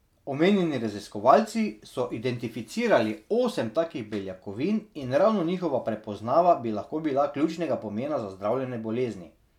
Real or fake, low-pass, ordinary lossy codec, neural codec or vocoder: real; 19.8 kHz; none; none